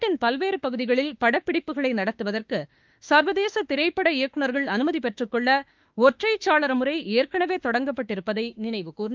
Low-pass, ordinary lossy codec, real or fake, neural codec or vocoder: 7.2 kHz; Opus, 24 kbps; fake; autoencoder, 48 kHz, 32 numbers a frame, DAC-VAE, trained on Japanese speech